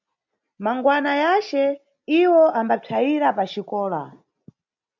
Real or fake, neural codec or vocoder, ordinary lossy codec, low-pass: real; none; MP3, 64 kbps; 7.2 kHz